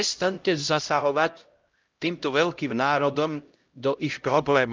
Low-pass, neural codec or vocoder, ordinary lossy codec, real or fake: 7.2 kHz; codec, 16 kHz, 0.5 kbps, X-Codec, HuBERT features, trained on LibriSpeech; Opus, 24 kbps; fake